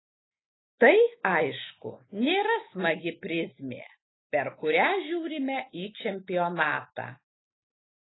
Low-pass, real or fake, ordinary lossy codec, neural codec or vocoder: 7.2 kHz; real; AAC, 16 kbps; none